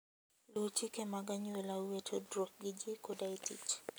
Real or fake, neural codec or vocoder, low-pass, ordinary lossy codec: real; none; none; none